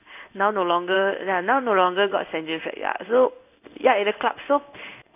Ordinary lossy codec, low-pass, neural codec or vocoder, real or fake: none; 3.6 kHz; codec, 16 kHz in and 24 kHz out, 1 kbps, XY-Tokenizer; fake